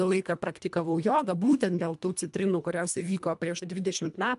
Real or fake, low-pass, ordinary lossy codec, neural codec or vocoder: fake; 10.8 kHz; MP3, 96 kbps; codec, 24 kHz, 1.5 kbps, HILCodec